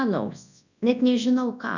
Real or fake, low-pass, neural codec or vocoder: fake; 7.2 kHz; codec, 24 kHz, 0.9 kbps, WavTokenizer, large speech release